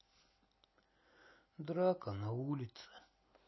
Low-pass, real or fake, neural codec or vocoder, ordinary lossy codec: 7.2 kHz; real; none; MP3, 24 kbps